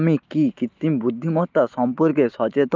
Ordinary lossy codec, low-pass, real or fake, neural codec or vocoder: Opus, 32 kbps; 7.2 kHz; real; none